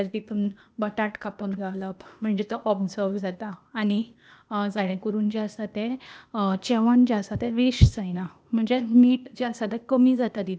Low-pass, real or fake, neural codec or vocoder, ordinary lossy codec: none; fake; codec, 16 kHz, 0.8 kbps, ZipCodec; none